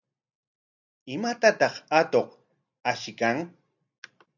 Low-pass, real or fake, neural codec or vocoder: 7.2 kHz; real; none